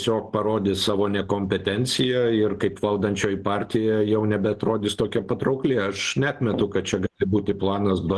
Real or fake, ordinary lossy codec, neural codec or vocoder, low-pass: real; Opus, 16 kbps; none; 10.8 kHz